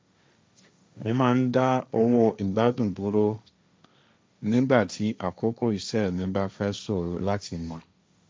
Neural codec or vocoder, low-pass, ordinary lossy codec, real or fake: codec, 16 kHz, 1.1 kbps, Voila-Tokenizer; none; none; fake